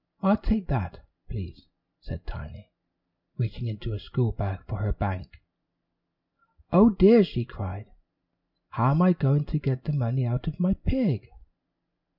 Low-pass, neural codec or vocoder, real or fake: 5.4 kHz; none; real